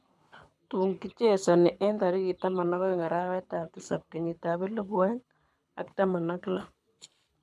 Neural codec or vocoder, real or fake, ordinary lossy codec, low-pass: codec, 24 kHz, 6 kbps, HILCodec; fake; none; none